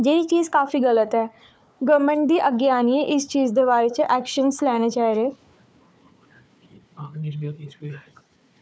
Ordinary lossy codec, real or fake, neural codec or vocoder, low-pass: none; fake; codec, 16 kHz, 4 kbps, FunCodec, trained on Chinese and English, 50 frames a second; none